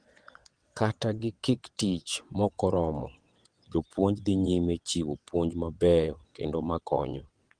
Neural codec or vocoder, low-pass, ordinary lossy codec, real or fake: vocoder, 22.05 kHz, 80 mel bands, WaveNeXt; 9.9 kHz; Opus, 24 kbps; fake